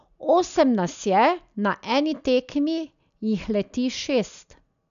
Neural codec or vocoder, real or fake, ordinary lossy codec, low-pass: none; real; none; 7.2 kHz